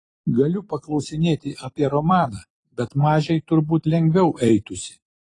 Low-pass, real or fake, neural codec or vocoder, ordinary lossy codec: 10.8 kHz; fake; vocoder, 44.1 kHz, 128 mel bands every 256 samples, BigVGAN v2; AAC, 32 kbps